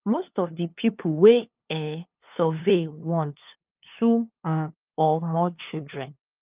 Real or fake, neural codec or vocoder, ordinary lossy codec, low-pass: fake; codec, 16 kHz, 2 kbps, FunCodec, trained on LibriTTS, 25 frames a second; Opus, 32 kbps; 3.6 kHz